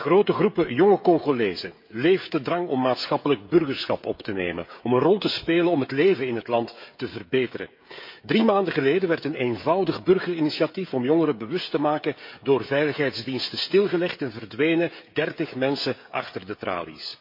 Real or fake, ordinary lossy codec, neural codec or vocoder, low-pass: fake; MP3, 32 kbps; codec, 16 kHz, 16 kbps, FreqCodec, smaller model; 5.4 kHz